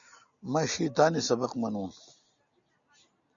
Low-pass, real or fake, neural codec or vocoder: 7.2 kHz; real; none